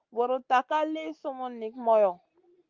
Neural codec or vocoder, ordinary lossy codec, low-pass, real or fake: none; Opus, 24 kbps; 7.2 kHz; real